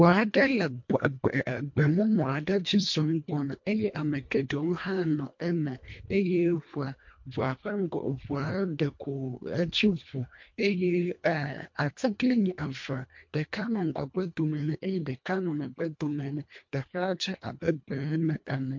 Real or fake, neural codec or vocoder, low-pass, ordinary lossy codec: fake; codec, 24 kHz, 1.5 kbps, HILCodec; 7.2 kHz; MP3, 48 kbps